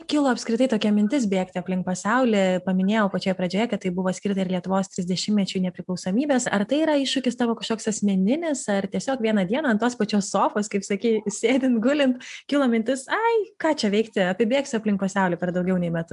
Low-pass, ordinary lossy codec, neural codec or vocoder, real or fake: 10.8 kHz; AAC, 96 kbps; none; real